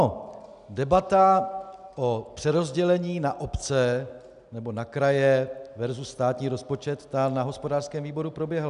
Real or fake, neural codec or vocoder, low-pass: real; none; 10.8 kHz